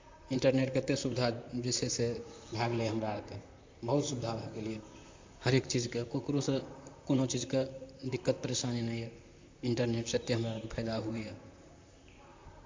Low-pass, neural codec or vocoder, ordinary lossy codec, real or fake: 7.2 kHz; vocoder, 44.1 kHz, 128 mel bands, Pupu-Vocoder; MP3, 48 kbps; fake